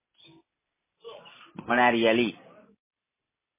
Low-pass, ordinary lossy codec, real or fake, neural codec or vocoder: 3.6 kHz; MP3, 16 kbps; real; none